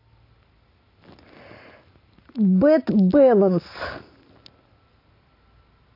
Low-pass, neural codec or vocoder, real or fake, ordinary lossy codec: 5.4 kHz; none; real; AAC, 32 kbps